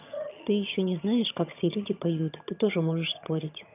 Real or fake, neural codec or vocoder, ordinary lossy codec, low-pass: fake; vocoder, 22.05 kHz, 80 mel bands, HiFi-GAN; none; 3.6 kHz